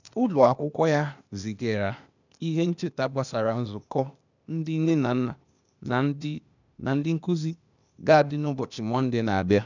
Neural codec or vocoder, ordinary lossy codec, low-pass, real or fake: codec, 16 kHz, 0.8 kbps, ZipCodec; none; 7.2 kHz; fake